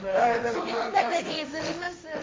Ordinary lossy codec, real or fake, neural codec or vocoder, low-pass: none; fake; codec, 16 kHz, 1.1 kbps, Voila-Tokenizer; none